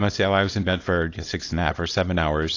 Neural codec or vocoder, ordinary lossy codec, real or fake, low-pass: codec, 24 kHz, 0.9 kbps, WavTokenizer, small release; AAC, 32 kbps; fake; 7.2 kHz